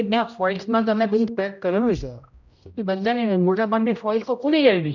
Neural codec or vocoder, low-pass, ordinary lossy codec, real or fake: codec, 16 kHz, 0.5 kbps, X-Codec, HuBERT features, trained on general audio; 7.2 kHz; none; fake